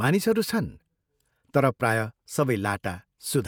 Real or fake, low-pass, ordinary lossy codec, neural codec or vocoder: fake; none; none; vocoder, 48 kHz, 128 mel bands, Vocos